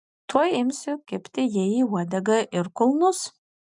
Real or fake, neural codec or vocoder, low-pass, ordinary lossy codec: real; none; 10.8 kHz; MP3, 96 kbps